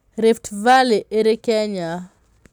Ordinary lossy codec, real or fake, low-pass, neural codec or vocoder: none; real; 19.8 kHz; none